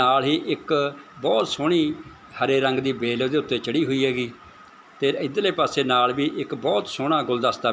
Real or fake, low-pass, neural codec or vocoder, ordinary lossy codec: real; none; none; none